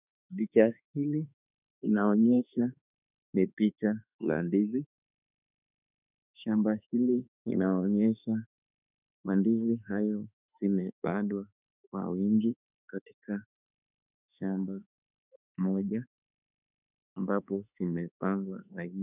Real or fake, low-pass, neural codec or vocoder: fake; 3.6 kHz; autoencoder, 48 kHz, 32 numbers a frame, DAC-VAE, trained on Japanese speech